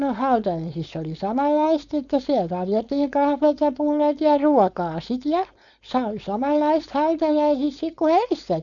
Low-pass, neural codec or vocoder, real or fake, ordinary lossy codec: 7.2 kHz; codec, 16 kHz, 4.8 kbps, FACodec; fake; none